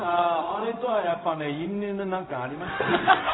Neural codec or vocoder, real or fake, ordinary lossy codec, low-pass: codec, 16 kHz, 0.4 kbps, LongCat-Audio-Codec; fake; AAC, 16 kbps; 7.2 kHz